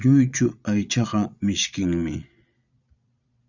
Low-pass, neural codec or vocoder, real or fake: 7.2 kHz; vocoder, 22.05 kHz, 80 mel bands, Vocos; fake